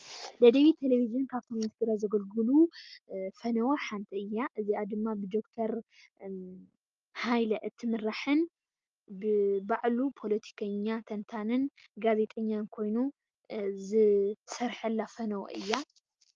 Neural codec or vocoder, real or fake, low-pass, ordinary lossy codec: none; real; 7.2 kHz; Opus, 24 kbps